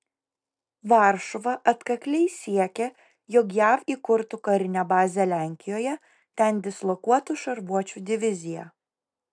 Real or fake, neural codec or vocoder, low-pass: real; none; 9.9 kHz